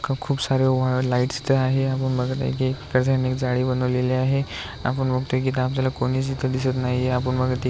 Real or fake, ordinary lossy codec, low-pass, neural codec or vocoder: real; none; none; none